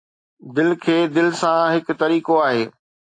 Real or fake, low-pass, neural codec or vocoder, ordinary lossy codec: real; 9.9 kHz; none; AAC, 48 kbps